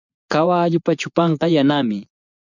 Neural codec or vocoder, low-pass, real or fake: vocoder, 24 kHz, 100 mel bands, Vocos; 7.2 kHz; fake